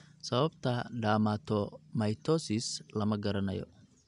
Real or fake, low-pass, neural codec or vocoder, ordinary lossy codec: real; 10.8 kHz; none; none